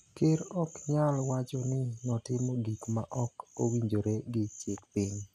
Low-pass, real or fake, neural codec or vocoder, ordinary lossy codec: 14.4 kHz; real; none; none